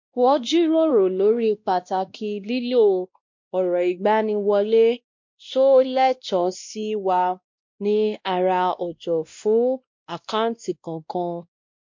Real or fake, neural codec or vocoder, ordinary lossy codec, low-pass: fake; codec, 16 kHz, 1 kbps, X-Codec, WavLM features, trained on Multilingual LibriSpeech; MP3, 48 kbps; 7.2 kHz